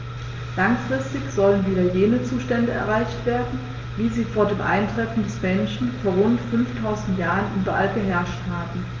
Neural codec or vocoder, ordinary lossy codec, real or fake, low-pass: none; Opus, 32 kbps; real; 7.2 kHz